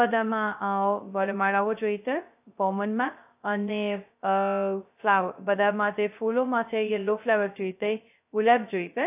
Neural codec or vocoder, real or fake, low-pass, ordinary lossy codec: codec, 16 kHz, 0.2 kbps, FocalCodec; fake; 3.6 kHz; AAC, 32 kbps